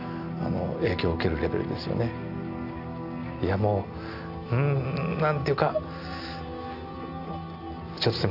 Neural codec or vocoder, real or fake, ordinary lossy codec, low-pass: none; real; AAC, 48 kbps; 5.4 kHz